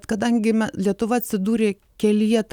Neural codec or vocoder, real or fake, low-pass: none; real; 19.8 kHz